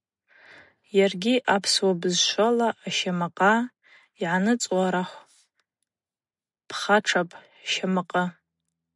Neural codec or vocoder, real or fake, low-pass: none; real; 10.8 kHz